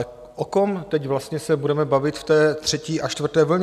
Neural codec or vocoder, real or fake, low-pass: none; real; 14.4 kHz